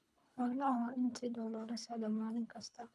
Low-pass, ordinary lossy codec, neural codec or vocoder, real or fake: none; none; codec, 24 kHz, 3 kbps, HILCodec; fake